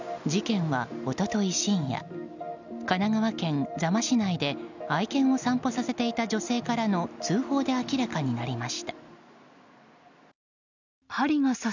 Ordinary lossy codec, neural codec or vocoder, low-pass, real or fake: none; none; 7.2 kHz; real